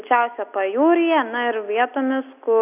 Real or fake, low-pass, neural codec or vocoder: real; 3.6 kHz; none